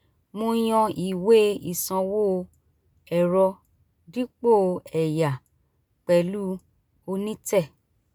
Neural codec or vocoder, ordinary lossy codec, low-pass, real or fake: none; none; none; real